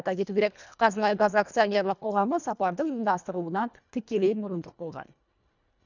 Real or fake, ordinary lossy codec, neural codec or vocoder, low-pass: fake; none; codec, 24 kHz, 1.5 kbps, HILCodec; 7.2 kHz